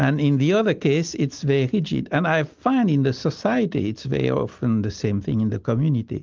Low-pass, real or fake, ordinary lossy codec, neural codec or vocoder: 7.2 kHz; real; Opus, 32 kbps; none